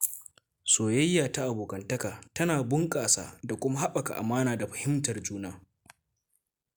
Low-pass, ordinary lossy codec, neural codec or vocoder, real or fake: none; none; none; real